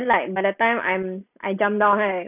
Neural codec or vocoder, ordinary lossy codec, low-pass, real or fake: none; none; 3.6 kHz; real